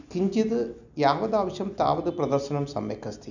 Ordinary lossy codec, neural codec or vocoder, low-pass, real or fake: none; none; 7.2 kHz; real